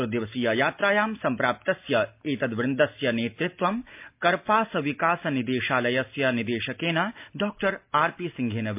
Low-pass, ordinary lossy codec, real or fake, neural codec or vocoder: 3.6 kHz; MP3, 32 kbps; real; none